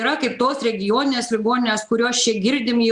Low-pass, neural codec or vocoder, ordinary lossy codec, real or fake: 10.8 kHz; none; Opus, 64 kbps; real